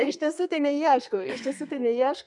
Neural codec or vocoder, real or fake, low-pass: codec, 32 kHz, 1.9 kbps, SNAC; fake; 10.8 kHz